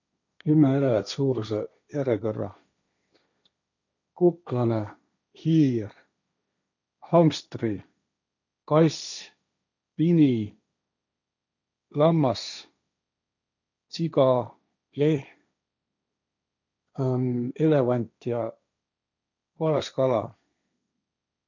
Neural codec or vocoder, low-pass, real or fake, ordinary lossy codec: codec, 16 kHz, 1.1 kbps, Voila-Tokenizer; 7.2 kHz; fake; none